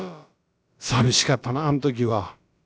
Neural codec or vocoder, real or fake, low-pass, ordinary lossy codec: codec, 16 kHz, about 1 kbps, DyCAST, with the encoder's durations; fake; none; none